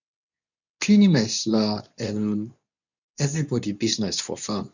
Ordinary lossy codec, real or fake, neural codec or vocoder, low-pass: none; fake; codec, 24 kHz, 0.9 kbps, WavTokenizer, medium speech release version 2; 7.2 kHz